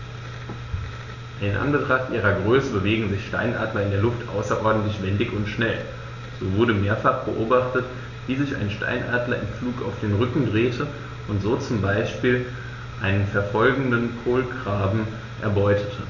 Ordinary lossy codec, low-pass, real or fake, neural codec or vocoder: none; 7.2 kHz; real; none